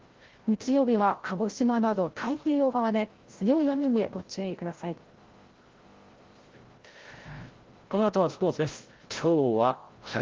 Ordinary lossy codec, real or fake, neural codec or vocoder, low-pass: Opus, 16 kbps; fake; codec, 16 kHz, 0.5 kbps, FreqCodec, larger model; 7.2 kHz